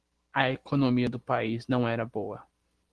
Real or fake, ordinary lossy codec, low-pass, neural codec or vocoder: real; Opus, 16 kbps; 10.8 kHz; none